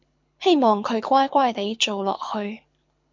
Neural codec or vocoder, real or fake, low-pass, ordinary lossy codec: codec, 24 kHz, 6 kbps, HILCodec; fake; 7.2 kHz; MP3, 64 kbps